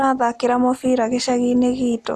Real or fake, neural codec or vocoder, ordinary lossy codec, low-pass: real; none; none; none